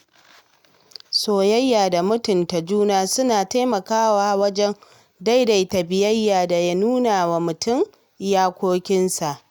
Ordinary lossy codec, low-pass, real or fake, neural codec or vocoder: none; none; real; none